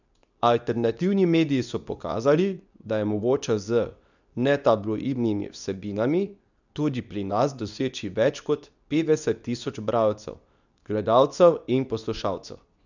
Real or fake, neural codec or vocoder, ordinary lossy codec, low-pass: fake; codec, 24 kHz, 0.9 kbps, WavTokenizer, medium speech release version 2; none; 7.2 kHz